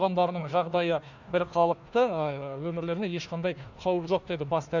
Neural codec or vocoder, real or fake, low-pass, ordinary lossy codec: codec, 16 kHz, 1 kbps, FunCodec, trained on Chinese and English, 50 frames a second; fake; 7.2 kHz; none